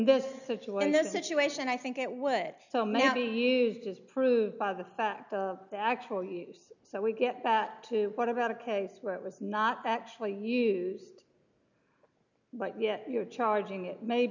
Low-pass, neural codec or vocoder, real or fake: 7.2 kHz; none; real